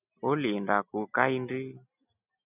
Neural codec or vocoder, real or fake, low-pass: none; real; 3.6 kHz